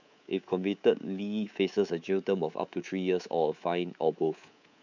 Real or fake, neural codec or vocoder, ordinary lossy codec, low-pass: fake; codec, 24 kHz, 3.1 kbps, DualCodec; none; 7.2 kHz